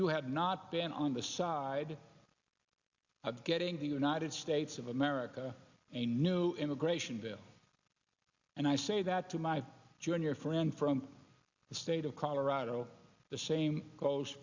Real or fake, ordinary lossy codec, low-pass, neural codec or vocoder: real; Opus, 64 kbps; 7.2 kHz; none